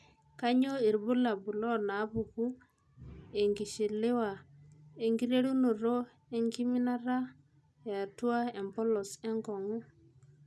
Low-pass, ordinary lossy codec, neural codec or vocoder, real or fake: none; none; none; real